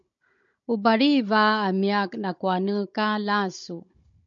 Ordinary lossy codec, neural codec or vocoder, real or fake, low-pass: MP3, 48 kbps; codec, 16 kHz, 16 kbps, FunCodec, trained on Chinese and English, 50 frames a second; fake; 7.2 kHz